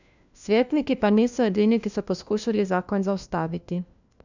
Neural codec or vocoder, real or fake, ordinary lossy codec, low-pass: codec, 16 kHz, 1 kbps, FunCodec, trained on LibriTTS, 50 frames a second; fake; none; 7.2 kHz